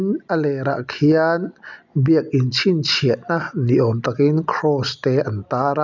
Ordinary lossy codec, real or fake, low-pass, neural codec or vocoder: none; real; 7.2 kHz; none